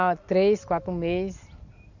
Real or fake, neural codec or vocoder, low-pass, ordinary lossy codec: real; none; 7.2 kHz; none